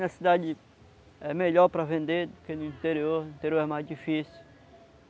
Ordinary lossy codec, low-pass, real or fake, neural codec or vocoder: none; none; real; none